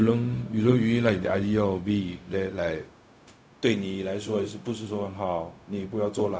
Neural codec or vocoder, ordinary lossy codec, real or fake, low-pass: codec, 16 kHz, 0.4 kbps, LongCat-Audio-Codec; none; fake; none